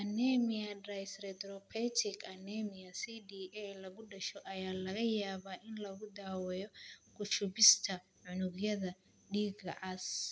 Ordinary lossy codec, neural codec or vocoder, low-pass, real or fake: none; none; none; real